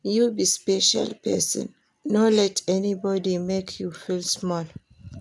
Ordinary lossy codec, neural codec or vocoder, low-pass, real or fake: none; none; none; real